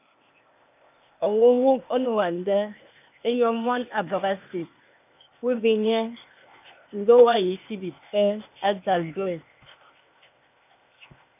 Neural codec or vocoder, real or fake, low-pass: codec, 16 kHz, 0.8 kbps, ZipCodec; fake; 3.6 kHz